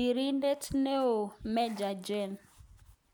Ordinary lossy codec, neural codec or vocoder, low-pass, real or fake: none; none; none; real